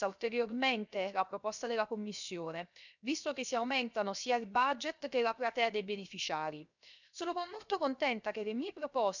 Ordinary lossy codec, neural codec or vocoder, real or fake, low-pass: none; codec, 16 kHz, 0.7 kbps, FocalCodec; fake; 7.2 kHz